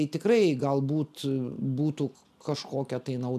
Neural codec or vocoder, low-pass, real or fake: none; 14.4 kHz; real